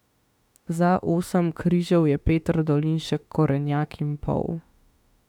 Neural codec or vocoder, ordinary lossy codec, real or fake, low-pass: autoencoder, 48 kHz, 32 numbers a frame, DAC-VAE, trained on Japanese speech; none; fake; 19.8 kHz